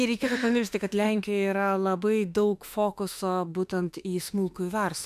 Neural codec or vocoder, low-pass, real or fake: autoencoder, 48 kHz, 32 numbers a frame, DAC-VAE, trained on Japanese speech; 14.4 kHz; fake